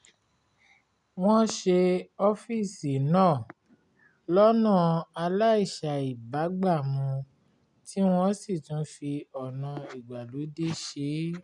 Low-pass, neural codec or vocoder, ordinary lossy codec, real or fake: 10.8 kHz; none; none; real